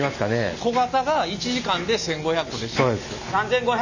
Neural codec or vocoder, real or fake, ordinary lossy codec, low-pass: none; real; none; 7.2 kHz